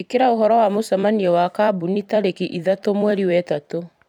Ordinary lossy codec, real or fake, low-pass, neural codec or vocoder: none; fake; 19.8 kHz; vocoder, 44.1 kHz, 128 mel bands every 256 samples, BigVGAN v2